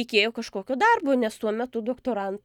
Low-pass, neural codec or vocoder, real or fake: 19.8 kHz; none; real